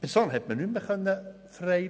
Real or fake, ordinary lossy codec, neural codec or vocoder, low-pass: real; none; none; none